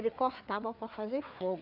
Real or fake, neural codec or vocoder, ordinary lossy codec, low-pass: fake; codec, 16 kHz, 4 kbps, FreqCodec, larger model; none; 5.4 kHz